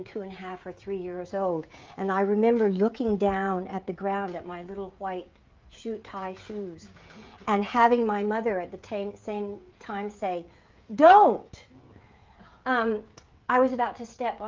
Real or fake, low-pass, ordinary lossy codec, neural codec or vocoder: fake; 7.2 kHz; Opus, 32 kbps; codec, 16 kHz, 16 kbps, FreqCodec, smaller model